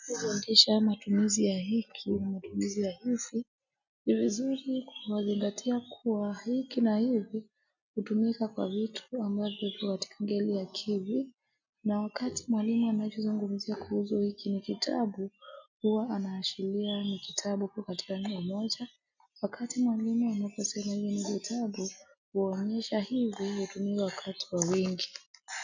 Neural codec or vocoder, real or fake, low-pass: none; real; 7.2 kHz